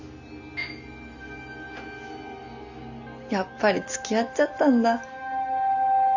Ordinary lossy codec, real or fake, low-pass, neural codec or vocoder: Opus, 64 kbps; real; 7.2 kHz; none